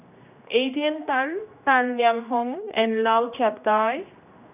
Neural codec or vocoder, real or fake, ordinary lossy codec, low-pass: codec, 16 kHz, 1 kbps, X-Codec, HuBERT features, trained on general audio; fake; none; 3.6 kHz